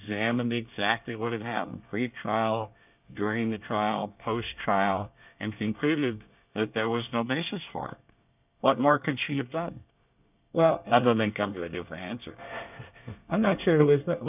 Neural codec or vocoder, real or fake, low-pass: codec, 24 kHz, 1 kbps, SNAC; fake; 3.6 kHz